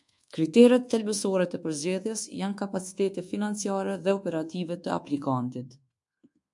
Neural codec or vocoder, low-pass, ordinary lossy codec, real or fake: codec, 24 kHz, 1.2 kbps, DualCodec; 10.8 kHz; MP3, 64 kbps; fake